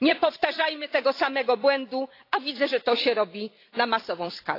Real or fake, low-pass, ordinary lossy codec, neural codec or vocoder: fake; 5.4 kHz; AAC, 32 kbps; vocoder, 44.1 kHz, 128 mel bands every 256 samples, BigVGAN v2